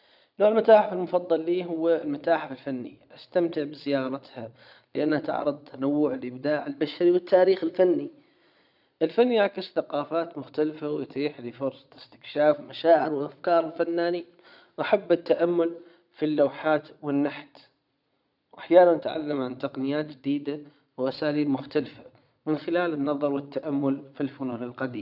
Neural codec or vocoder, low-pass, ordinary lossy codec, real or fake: vocoder, 22.05 kHz, 80 mel bands, Vocos; 5.4 kHz; none; fake